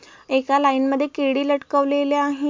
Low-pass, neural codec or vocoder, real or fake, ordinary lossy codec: 7.2 kHz; none; real; MP3, 64 kbps